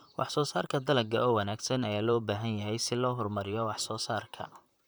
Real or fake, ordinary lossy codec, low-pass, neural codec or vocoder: fake; none; none; vocoder, 44.1 kHz, 128 mel bands, Pupu-Vocoder